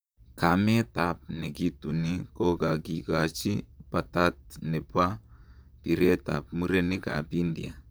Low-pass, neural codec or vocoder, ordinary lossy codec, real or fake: none; vocoder, 44.1 kHz, 128 mel bands, Pupu-Vocoder; none; fake